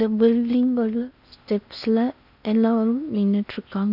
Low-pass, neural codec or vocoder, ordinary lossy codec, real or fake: 5.4 kHz; codec, 16 kHz in and 24 kHz out, 0.8 kbps, FocalCodec, streaming, 65536 codes; none; fake